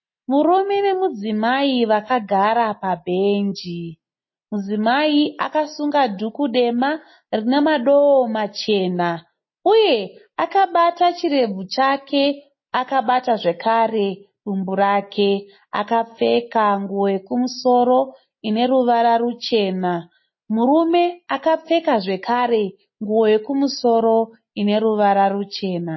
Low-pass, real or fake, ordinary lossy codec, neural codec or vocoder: 7.2 kHz; real; MP3, 24 kbps; none